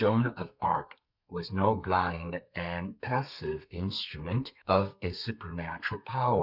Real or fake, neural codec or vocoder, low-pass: fake; codec, 32 kHz, 1.9 kbps, SNAC; 5.4 kHz